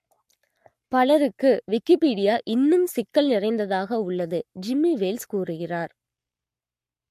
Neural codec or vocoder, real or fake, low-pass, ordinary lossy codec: codec, 44.1 kHz, 7.8 kbps, Pupu-Codec; fake; 14.4 kHz; MP3, 64 kbps